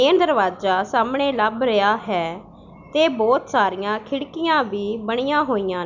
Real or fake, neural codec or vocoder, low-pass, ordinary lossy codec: real; none; 7.2 kHz; none